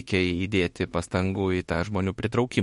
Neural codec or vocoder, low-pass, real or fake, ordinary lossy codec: autoencoder, 48 kHz, 32 numbers a frame, DAC-VAE, trained on Japanese speech; 19.8 kHz; fake; MP3, 48 kbps